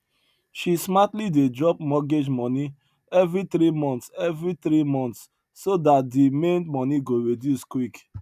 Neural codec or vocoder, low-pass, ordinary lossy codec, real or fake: none; 14.4 kHz; none; real